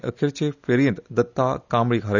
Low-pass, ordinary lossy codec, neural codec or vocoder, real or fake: 7.2 kHz; none; none; real